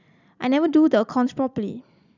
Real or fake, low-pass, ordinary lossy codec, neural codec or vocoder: fake; 7.2 kHz; none; vocoder, 44.1 kHz, 128 mel bands every 256 samples, BigVGAN v2